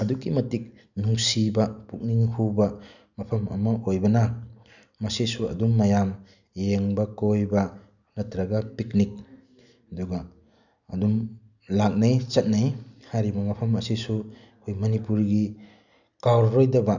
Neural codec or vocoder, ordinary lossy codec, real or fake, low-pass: none; none; real; 7.2 kHz